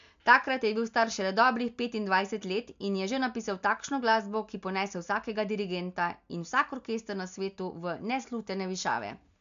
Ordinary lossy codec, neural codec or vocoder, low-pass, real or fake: MP3, 64 kbps; none; 7.2 kHz; real